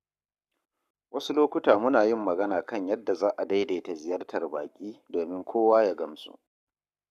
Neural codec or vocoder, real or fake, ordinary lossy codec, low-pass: codec, 44.1 kHz, 7.8 kbps, Pupu-Codec; fake; none; 14.4 kHz